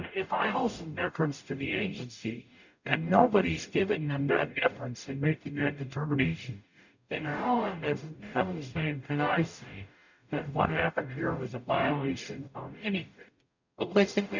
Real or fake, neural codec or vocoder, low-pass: fake; codec, 44.1 kHz, 0.9 kbps, DAC; 7.2 kHz